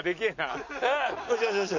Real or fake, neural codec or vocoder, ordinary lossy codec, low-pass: real; none; none; 7.2 kHz